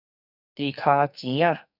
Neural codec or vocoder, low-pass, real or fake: codec, 44.1 kHz, 2.6 kbps, SNAC; 5.4 kHz; fake